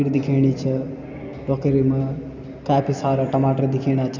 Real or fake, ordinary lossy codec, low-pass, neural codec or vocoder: real; none; 7.2 kHz; none